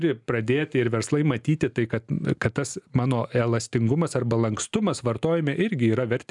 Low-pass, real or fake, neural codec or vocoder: 10.8 kHz; real; none